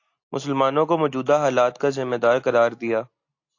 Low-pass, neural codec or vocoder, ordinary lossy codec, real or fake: 7.2 kHz; none; AAC, 48 kbps; real